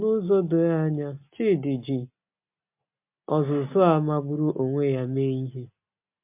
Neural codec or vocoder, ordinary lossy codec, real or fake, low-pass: none; MP3, 32 kbps; real; 3.6 kHz